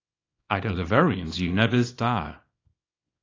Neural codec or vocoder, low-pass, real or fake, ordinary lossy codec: codec, 24 kHz, 0.9 kbps, WavTokenizer, small release; 7.2 kHz; fake; AAC, 32 kbps